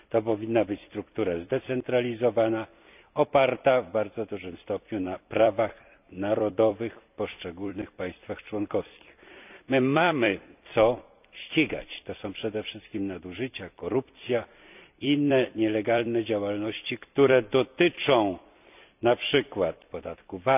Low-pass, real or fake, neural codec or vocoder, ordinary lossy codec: 3.6 kHz; fake; vocoder, 44.1 kHz, 128 mel bands every 256 samples, BigVGAN v2; none